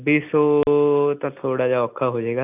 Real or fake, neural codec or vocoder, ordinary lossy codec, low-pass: real; none; none; 3.6 kHz